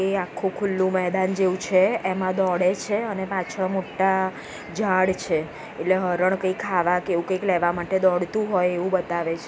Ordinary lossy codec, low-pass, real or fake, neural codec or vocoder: none; none; real; none